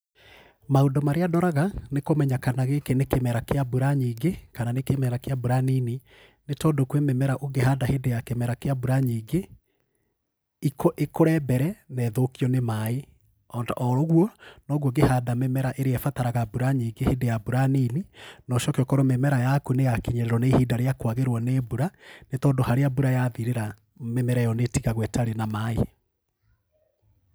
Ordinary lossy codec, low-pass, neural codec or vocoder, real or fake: none; none; none; real